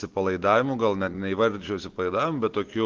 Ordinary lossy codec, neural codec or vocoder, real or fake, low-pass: Opus, 16 kbps; none; real; 7.2 kHz